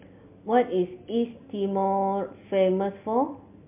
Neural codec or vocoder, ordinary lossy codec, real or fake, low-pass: none; MP3, 32 kbps; real; 3.6 kHz